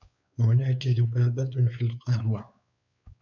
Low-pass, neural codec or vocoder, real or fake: 7.2 kHz; codec, 16 kHz, 4 kbps, X-Codec, WavLM features, trained on Multilingual LibriSpeech; fake